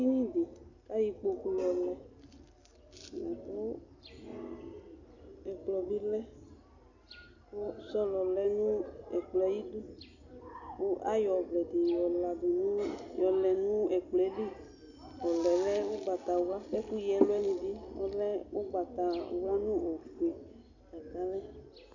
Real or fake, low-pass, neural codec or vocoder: real; 7.2 kHz; none